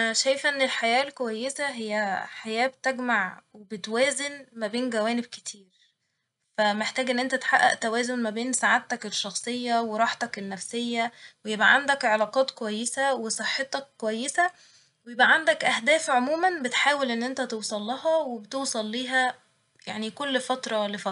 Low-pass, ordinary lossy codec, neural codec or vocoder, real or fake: 10.8 kHz; none; none; real